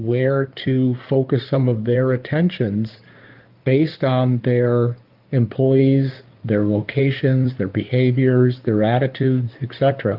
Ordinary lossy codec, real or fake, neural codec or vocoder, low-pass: Opus, 32 kbps; fake; codec, 16 kHz in and 24 kHz out, 2.2 kbps, FireRedTTS-2 codec; 5.4 kHz